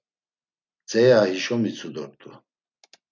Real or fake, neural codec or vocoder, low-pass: real; none; 7.2 kHz